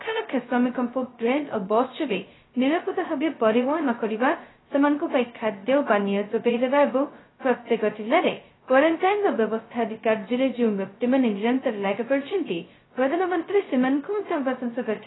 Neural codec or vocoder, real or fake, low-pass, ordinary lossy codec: codec, 16 kHz, 0.2 kbps, FocalCodec; fake; 7.2 kHz; AAC, 16 kbps